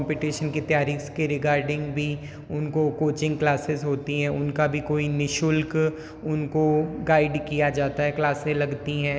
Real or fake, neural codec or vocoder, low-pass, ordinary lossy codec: real; none; none; none